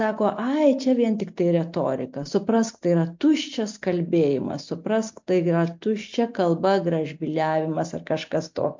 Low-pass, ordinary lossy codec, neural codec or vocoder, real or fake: 7.2 kHz; MP3, 48 kbps; none; real